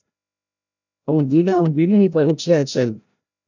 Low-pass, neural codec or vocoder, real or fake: 7.2 kHz; codec, 16 kHz, 0.5 kbps, FreqCodec, larger model; fake